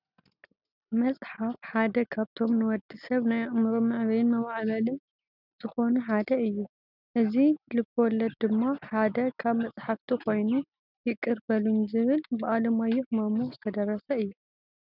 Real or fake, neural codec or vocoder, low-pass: real; none; 5.4 kHz